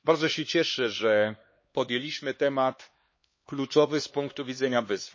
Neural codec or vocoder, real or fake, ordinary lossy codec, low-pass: codec, 16 kHz, 2 kbps, X-Codec, HuBERT features, trained on LibriSpeech; fake; MP3, 32 kbps; 7.2 kHz